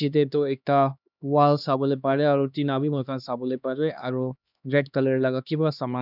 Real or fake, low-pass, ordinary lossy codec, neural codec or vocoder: fake; 5.4 kHz; none; codec, 16 kHz, 2 kbps, X-Codec, HuBERT features, trained on LibriSpeech